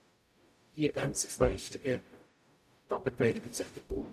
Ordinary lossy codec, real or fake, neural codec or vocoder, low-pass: none; fake; codec, 44.1 kHz, 0.9 kbps, DAC; 14.4 kHz